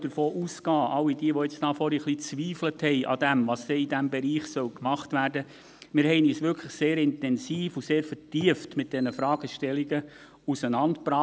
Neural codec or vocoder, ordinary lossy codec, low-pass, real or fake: none; none; none; real